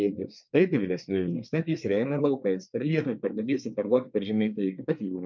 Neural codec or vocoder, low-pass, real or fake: codec, 24 kHz, 1 kbps, SNAC; 7.2 kHz; fake